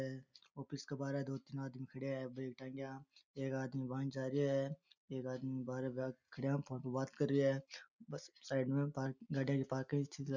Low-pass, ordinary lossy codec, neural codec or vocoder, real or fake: 7.2 kHz; none; none; real